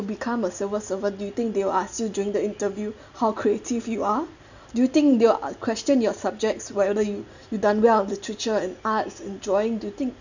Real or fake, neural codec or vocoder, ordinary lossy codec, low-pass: real; none; none; 7.2 kHz